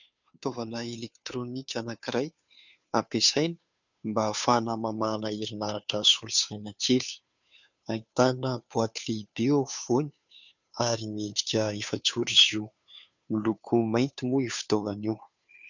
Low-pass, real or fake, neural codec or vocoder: 7.2 kHz; fake; codec, 16 kHz, 2 kbps, FunCodec, trained on Chinese and English, 25 frames a second